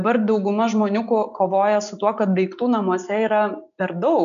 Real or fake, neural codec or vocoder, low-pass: real; none; 7.2 kHz